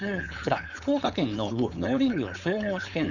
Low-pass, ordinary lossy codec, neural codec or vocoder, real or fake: 7.2 kHz; none; codec, 16 kHz, 4.8 kbps, FACodec; fake